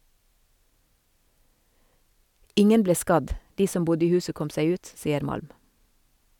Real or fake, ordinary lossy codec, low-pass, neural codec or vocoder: real; none; 19.8 kHz; none